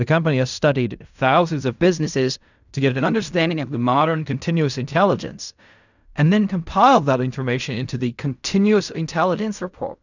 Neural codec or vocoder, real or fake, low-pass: codec, 16 kHz in and 24 kHz out, 0.4 kbps, LongCat-Audio-Codec, fine tuned four codebook decoder; fake; 7.2 kHz